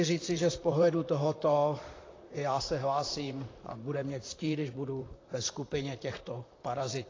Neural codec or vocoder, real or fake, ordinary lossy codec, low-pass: vocoder, 44.1 kHz, 128 mel bands, Pupu-Vocoder; fake; AAC, 32 kbps; 7.2 kHz